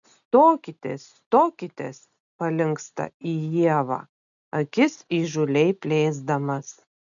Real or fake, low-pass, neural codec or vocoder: real; 7.2 kHz; none